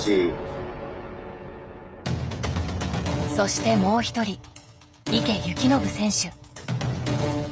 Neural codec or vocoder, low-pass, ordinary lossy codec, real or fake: codec, 16 kHz, 16 kbps, FreqCodec, smaller model; none; none; fake